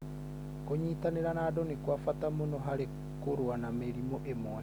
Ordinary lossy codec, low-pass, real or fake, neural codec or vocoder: none; none; real; none